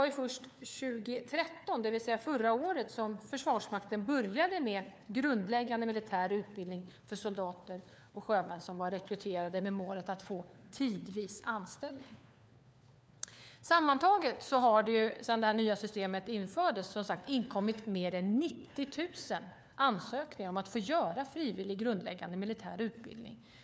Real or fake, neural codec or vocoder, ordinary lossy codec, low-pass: fake; codec, 16 kHz, 4 kbps, FunCodec, trained on LibriTTS, 50 frames a second; none; none